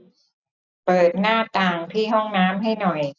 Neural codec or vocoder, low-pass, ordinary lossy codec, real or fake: none; 7.2 kHz; none; real